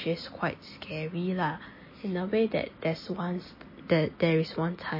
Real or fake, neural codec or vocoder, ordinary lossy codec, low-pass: real; none; MP3, 24 kbps; 5.4 kHz